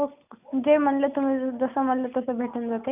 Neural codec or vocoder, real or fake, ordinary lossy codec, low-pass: none; real; none; 3.6 kHz